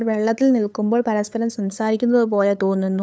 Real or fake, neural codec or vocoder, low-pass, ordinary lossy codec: fake; codec, 16 kHz, 8 kbps, FunCodec, trained on LibriTTS, 25 frames a second; none; none